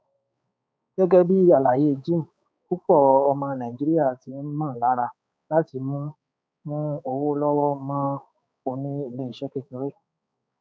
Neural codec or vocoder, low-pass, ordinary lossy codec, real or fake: codec, 16 kHz, 4 kbps, X-Codec, HuBERT features, trained on balanced general audio; none; none; fake